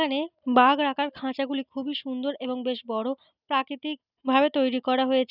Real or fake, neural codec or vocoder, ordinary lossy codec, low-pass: real; none; none; 5.4 kHz